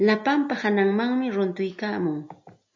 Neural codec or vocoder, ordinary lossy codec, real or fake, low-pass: none; MP3, 64 kbps; real; 7.2 kHz